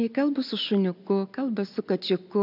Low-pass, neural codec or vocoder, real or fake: 5.4 kHz; none; real